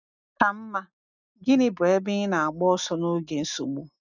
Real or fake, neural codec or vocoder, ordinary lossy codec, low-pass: real; none; none; 7.2 kHz